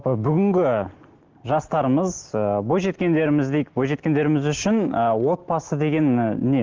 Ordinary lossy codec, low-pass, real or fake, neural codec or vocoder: Opus, 16 kbps; 7.2 kHz; real; none